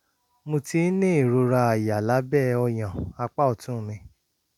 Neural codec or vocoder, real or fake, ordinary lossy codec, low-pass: none; real; none; 19.8 kHz